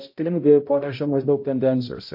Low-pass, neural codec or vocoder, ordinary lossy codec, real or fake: 5.4 kHz; codec, 16 kHz, 0.5 kbps, X-Codec, HuBERT features, trained on balanced general audio; MP3, 32 kbps; fake